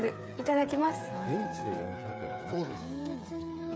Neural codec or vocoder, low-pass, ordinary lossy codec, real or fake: codec, 16 kHz, 8 kbps, FreqCodec, smaller model; none; none; fake